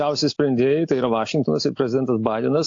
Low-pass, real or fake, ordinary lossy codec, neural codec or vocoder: 7.2 kHz; real; AAC, 48 kbps; none